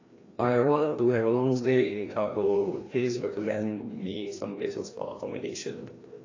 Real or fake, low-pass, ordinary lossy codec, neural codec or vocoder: fake; 7.2 kHz; AAC, 32 kbps; codec, 16 kHz, 1 kbps, FreqCodec, larger model